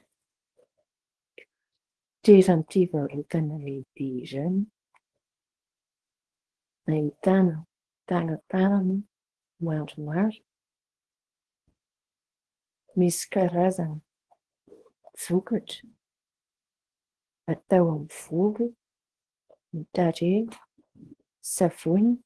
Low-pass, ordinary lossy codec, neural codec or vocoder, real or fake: 10.8 kHz; Opus, 16 kbps; codec, 24 kHz, 0.9 kbps, WavTokenizer, small release; fake